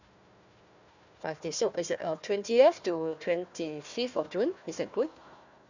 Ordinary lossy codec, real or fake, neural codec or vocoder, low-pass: none; fake; codec, 16 kHz, 1 kbps, FunCodec, trained on Chinese and English, 50 frames a second; 7.2 kHz